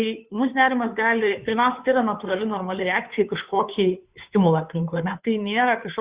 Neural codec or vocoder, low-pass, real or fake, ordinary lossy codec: codec, 16 kHz, 2 kbps, FunCodec, trained on Chinese and English, 25 frames a second; 3.6 kHz; fake; Opus, 64 kbps